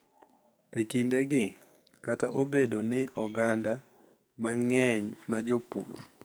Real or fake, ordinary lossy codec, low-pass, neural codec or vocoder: fake; none; none; codec, 44.1 kHz, 2.6 kbps, SNAC